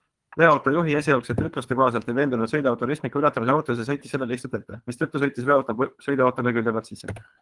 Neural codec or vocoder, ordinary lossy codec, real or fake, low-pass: codec, 24 kHz, 3 kbps, HILCodec; Opus, 32 kbps; fake; 10.8 kHz